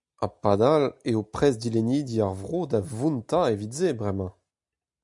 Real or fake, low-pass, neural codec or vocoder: real; 10.8 kHz; none